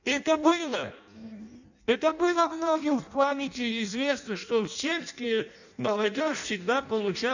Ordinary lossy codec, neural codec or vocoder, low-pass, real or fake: none; codec, 16 kHz in and 24 kHz out, 0.6 kbps, FireRedTTS-2 codec; 7.2 kHz; fake